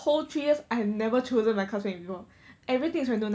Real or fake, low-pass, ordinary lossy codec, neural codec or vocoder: real; none; none; none